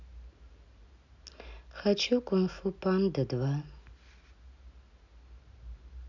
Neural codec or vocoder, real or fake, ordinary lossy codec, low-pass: none; real; none; 7.2 kHz